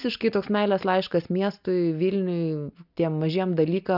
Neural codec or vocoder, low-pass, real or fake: none; 5.4 kHz; real